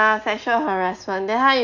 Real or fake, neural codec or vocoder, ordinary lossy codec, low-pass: real; none; none; 7.2 kHz